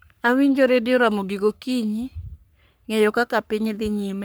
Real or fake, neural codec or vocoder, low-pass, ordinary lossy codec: fake; codec, 44.1 kHz, 3.4 kbps, Pupu-Codec; none; none